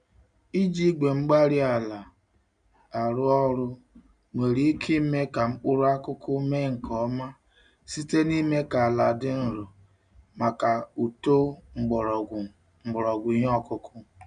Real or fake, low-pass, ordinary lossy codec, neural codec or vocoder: real; 9.9 kHz; none; none